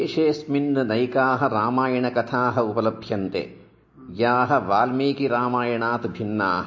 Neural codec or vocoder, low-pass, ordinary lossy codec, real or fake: none; 7.2 kHz; MP3, 32 kbps; real